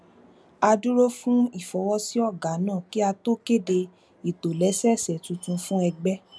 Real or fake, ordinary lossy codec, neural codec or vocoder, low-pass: real; none; none; none